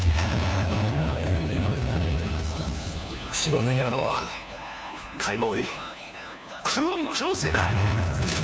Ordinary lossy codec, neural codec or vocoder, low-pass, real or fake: none; codec, 16 kHz, 1 kbps, FunCodec, trained on LibriTTS, 50 frames a second; none; fake